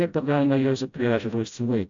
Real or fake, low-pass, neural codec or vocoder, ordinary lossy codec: fake; 7.2 kHz; codec, 16 kHz, 0.5 kbps, FreqCodec, smaller model; AAC, 64 kbps